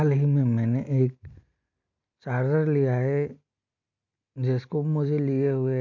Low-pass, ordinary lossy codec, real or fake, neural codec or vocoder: 7.2 kHz; MP3, 48 kbps; real; none